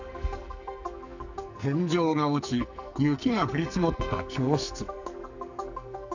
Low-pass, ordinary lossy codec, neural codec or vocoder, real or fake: 7.2 kHz; none; codec, 44.1 kHz, 2.6 kbps, SNAC; fake